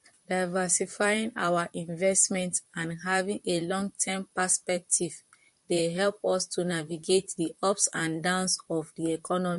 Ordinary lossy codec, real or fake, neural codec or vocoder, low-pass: MP3, 48 kbps; fake; vocoder, 44.1 kHz, 128 mel bands, Pupu-Vocoder; 14.4 kHz